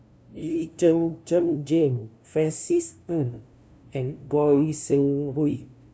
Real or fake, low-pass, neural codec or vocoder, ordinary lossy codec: fake; none; codec, 16 kHz, 0.5 kbps, FunCodec, trained on LibriTTS, 25 frames a second; none